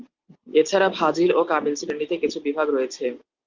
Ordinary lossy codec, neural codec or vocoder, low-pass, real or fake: Opus, 24 kbps; none; 7.2 kHz; real